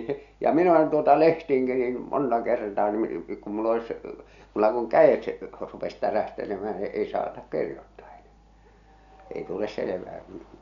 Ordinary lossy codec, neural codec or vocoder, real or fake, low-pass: none; none; real; 7.2 kHz